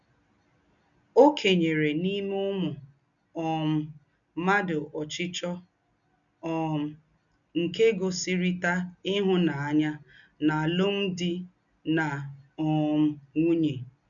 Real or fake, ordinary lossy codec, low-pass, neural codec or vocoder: real; none; 7.2 kHz; none